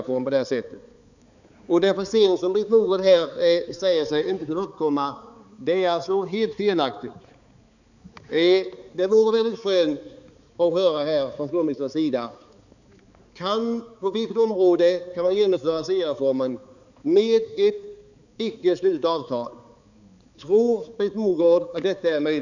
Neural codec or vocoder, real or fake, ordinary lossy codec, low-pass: codec, 16 kHz, 4 kbps, X-Codec, HuBERT features, trained on balanced general audio; fake; none; 7.2 kHz